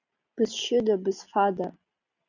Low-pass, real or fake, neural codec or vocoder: 7.2 kHz; real; none